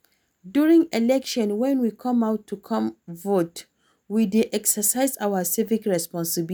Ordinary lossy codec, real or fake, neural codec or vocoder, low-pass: none; real; none; none